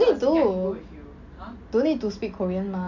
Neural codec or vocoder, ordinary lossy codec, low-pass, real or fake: none; MP3, 64 kbps; 7.2 kHz; real